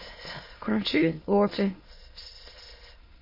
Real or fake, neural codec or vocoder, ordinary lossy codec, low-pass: fake; autoencoder, 22.05 kHz, a latent of 192 numbers a frame, VITS, trained on many speakers; MP3, 24 kbps; 5.4 kHz